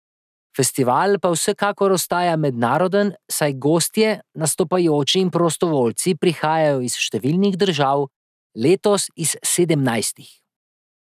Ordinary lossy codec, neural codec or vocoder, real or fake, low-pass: none; none; real; 14.4 kHz